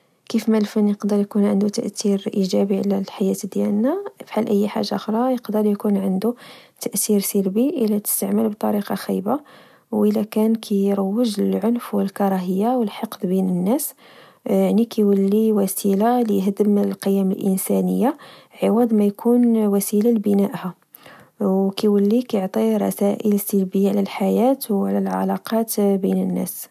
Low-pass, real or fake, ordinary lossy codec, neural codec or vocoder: 14.4 kHz; real; none; none